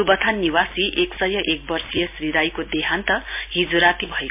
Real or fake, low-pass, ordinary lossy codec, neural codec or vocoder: real; 3.6 kHz; MP3, 32 kbps; none